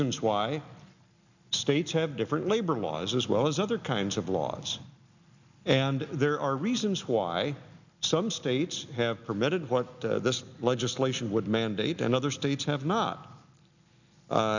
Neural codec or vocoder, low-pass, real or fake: none; 7.2 kHz; real